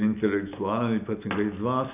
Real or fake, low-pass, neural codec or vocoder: real; 3.6 kHz; none